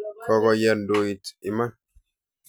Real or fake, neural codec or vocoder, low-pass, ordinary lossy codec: real; none; none; none